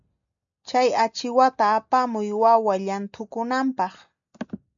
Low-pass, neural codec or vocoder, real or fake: 7.2 kHz; none; real